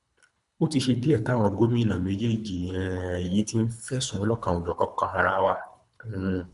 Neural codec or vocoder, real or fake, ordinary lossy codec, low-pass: codec, 24 kHz, 3 kbps, HILCodec; fake; AAC, 96 kbps; 10.8 kHz